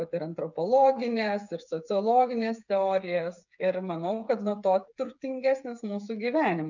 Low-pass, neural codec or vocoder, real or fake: 7.2 kHz; codec, 16 kHz, 8 kbps, FreqCodec, smaller model; fake